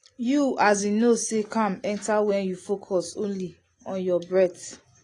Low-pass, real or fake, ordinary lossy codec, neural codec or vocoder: 10.8 kHz; real; AAC, 32 kbps; none